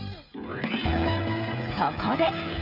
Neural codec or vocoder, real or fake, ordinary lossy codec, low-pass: codec, 16 kHz, 16 kbps, FreqCodec, smaller model; fake; none; 5.4 kHz